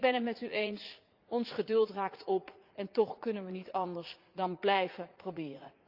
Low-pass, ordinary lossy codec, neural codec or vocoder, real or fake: 5.4 kHz; Opus, 24 kbps; vocoder, 44.1 kHz, 80 mel bands, Vocos; fake